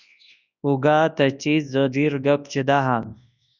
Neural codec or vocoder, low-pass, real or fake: codec, 24 kHz, 0.9 kbps, WavTokenizer, large speech release; 7.2 kHz; fake